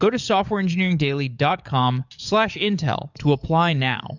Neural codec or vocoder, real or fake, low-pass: codec, 44.1 kHz, 7.8 kbps, DAC; fake; 7.2 kHz